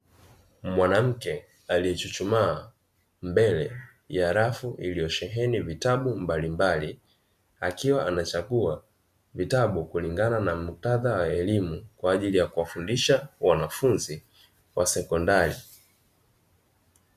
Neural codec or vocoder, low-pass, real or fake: none; 14.4 kHz; real